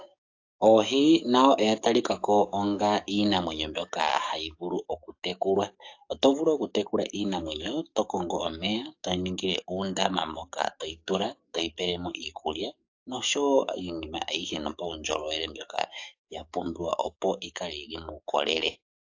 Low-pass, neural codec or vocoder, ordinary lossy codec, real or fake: 7.2 kHz; codec, 44.1 kHz, 7.8 kbps, DAC; AAC, 48 kbps; fake